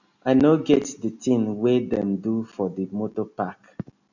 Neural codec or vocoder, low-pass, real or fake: none; 7.2 kHz; real